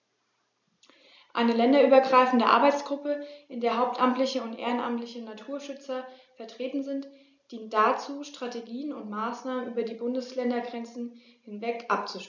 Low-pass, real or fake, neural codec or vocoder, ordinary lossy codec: 7.2 kHz; real; none; none